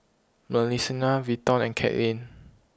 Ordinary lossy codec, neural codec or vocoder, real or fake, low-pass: none; none; real; none